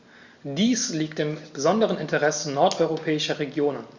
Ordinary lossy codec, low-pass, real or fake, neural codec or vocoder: Opus, 64 kbps; 7.2 kHz; real; none